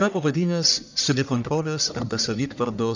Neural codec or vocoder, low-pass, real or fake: codec, 44.1 kHz, 1.7 kbps, Pupu-Codec; 7.2 kHz; fake